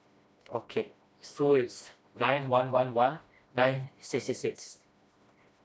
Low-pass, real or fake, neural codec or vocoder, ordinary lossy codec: none; fake; codec, 16 kHz, 1 kbps, FreqCodec, smaller model; none